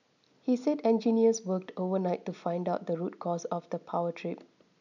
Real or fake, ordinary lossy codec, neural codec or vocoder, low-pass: real; none; none; 7.2 kHz